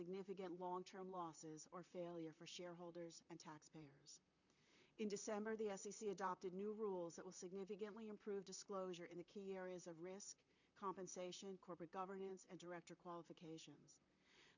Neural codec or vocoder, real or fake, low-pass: vocoder, 44.1 kHz, 128 mel bands, Pupu-Vocoder; fake; 7.2 kHz